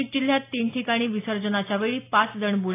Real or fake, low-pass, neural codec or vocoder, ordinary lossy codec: real; 3.6 kHz; none; AAC, 24 kbps